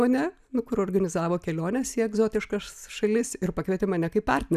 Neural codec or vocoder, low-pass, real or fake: none; 14.4 kHz; real